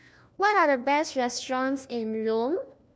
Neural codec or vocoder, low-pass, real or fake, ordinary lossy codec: codec, 16 kHz, 1 kbps, FunCodec, trained on LibriTTS, 50 frames a second; none; fake; none